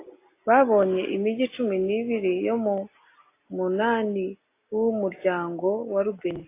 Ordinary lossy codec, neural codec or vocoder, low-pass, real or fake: AAC, 24 kbps; none; 3.6 kHz; real